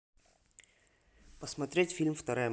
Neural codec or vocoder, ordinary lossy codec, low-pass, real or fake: none; none; none; real